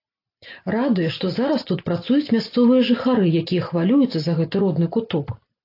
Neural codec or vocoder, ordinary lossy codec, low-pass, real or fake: none; AAC, 32 kbps; 5.4 kHz; real